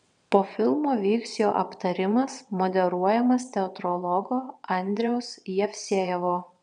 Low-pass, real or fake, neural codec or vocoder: 9.9 kHz; fake; vocoder, 22.05 kHz, 80 mel bands, WaveNeXt